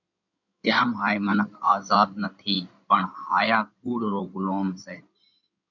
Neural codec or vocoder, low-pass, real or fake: codec, 16 kHz in and 24 kHz out, 2.2 kbps, FireRedTTS-2 codec; 7.2 kHz; fake